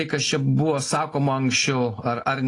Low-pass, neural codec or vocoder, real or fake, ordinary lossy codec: 10.8 kHz; none; real; AAC, 32 kbps